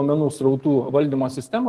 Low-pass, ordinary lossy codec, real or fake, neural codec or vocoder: 14.4 kHz; Opus, 24 kbps; real; none